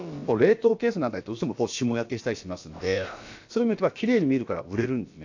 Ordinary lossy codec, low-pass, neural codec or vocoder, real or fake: AAC, 48 kbps; 7.2 kHz; codec, 16 kHz, about 1 kbps, DyCAST, with the encoder's durations; fake